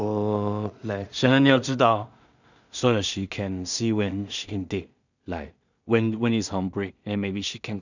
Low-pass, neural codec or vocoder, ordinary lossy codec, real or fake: 7.2 kHz; codec, 16 kHz in and 24 kHz out, 0.4 kbps, LongCat-Audio-Codec, two codebook decoder; none; fake